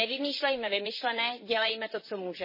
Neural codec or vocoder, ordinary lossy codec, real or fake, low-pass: vocoder, 44.1 kHz, 128 mel bands, Pupu-Vocoder; MP3, 24 kbps; fake; 5.4 kHz